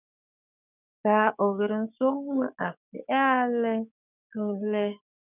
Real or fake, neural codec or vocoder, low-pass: fake; codec, 16 kHz, 6 kbps, DAC; 3.6 kHz